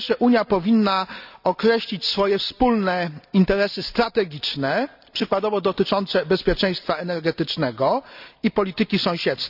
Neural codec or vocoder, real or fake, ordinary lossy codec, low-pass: none; real; none; 5.4 kHz